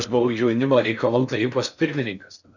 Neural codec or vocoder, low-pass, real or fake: codec, 16 kHz in and 24 kHz out, 0.6 kbps, FocalCodec, streaming, 4096 codes; 7.2 kHz; fake